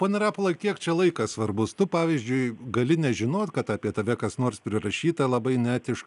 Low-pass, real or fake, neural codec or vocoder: 10.8 kHz; real; none